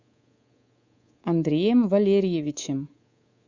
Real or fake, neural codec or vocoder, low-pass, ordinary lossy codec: fake; codec, 24 kHz, 3.1 kbps, DualCodec; 7.2 kHz; Opus, 64 kbps